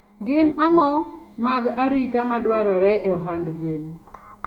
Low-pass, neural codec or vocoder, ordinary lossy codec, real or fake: 19.8 kHz; codec, 44.1 kHz, 2.6 kbps, DAC; none; fake